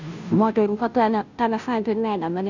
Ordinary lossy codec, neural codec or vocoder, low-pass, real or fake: none; codec, 16 kHz, 0.5 kbps, FunCodec, trained on Chinese and English, 25 frames a second; 7.2 kHz; fake